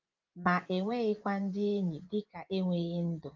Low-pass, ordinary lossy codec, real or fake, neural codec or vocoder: 7.2 kHz; Opus, 32 kbps; fake; vocoder, 24 kHz, 100 mel bands, Vocos